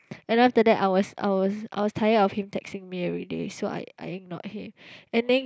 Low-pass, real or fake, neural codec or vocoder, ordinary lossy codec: none; real; none; none